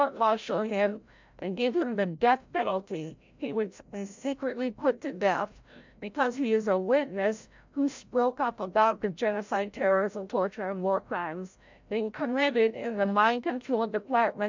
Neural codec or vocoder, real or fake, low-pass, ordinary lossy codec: codec, 16 kHz, 0.5 kbps, FreqCodec, larger model; fake; 7.2 kHz; MP3, 64 kbps